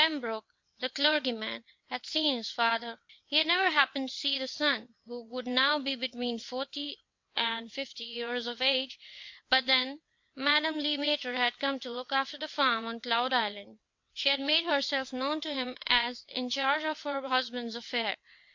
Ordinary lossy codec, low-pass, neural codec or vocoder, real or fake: MP3, 48 kbps; 7.2 kHz; vocoder, 22.05 kHz, 80 mel bands, WaveNeXt; fake